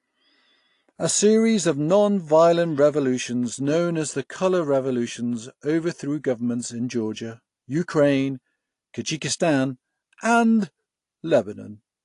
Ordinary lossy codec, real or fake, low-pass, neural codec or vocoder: AAC, 48 kbps; real; 10.8 kHz; none